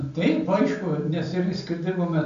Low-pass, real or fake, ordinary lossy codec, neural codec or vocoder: 7.2 kHz; real; AAC, 64 kbps; none